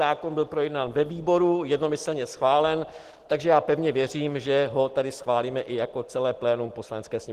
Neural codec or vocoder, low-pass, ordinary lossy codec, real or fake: none; 14.4 kHz; Opus, 16 kbps; real